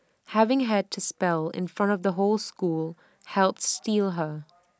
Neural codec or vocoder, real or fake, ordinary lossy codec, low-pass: none; real; none; none